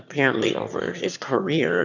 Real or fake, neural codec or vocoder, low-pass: fake; autoencoder, 22.05 kHz, a latent of 192 numbers a frame, VITS, trained on one speaker; 7.2 kHz